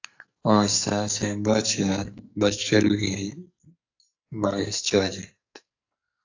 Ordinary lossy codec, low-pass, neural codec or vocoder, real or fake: AAC, 48 kbps; 7.2 kHz; codec, 44.1 kHz, 2.6 kbps, SNAC; fake